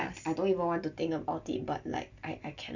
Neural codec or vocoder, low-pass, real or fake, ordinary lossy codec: none; 7.2 kHz; real; none